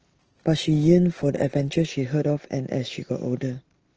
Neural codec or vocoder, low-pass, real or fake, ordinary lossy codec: none; 7.2 kHz; real; Opus, 16 kbps